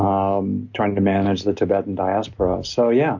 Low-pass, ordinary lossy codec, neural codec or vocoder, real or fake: 7.2 kHz; MP3, 48 kbps; none; real